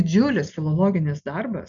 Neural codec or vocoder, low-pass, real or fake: none; 7.2 kHz; real